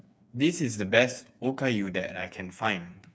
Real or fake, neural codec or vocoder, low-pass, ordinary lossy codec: fake; codec, 16 kHz, 4 kbps, FreqCodec, smaller model; none; none